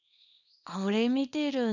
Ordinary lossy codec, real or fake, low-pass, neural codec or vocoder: none; fake; 7.2 kHz; codec, 24 kHz, 0.9 kbps, WavTokenizer, small release